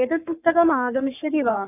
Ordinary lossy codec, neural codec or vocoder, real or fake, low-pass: none; codec, 44.1 kHz, 3.4 kbps, Pupu-Codec; fake; 3.6 kHz